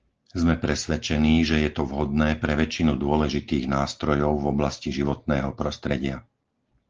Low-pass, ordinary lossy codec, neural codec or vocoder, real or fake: 7.2 kHz; Opus, 16 kbps; none; real